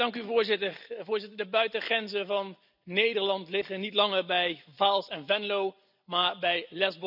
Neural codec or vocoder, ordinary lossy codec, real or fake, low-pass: none; none; real; 5.4 kHz